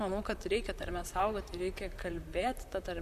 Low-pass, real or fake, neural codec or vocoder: 14.4 kHz; fake; vocoder, 44.1 kHz, 128 mel bands, Pupu-Vocoder